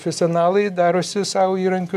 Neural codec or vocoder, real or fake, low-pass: none; real; 14.4 kHz